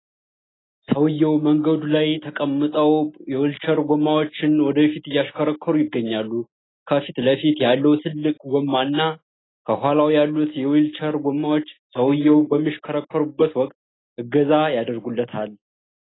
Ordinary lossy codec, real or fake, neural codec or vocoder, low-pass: AAC, 16 kbps; real; none; 7.2 kHz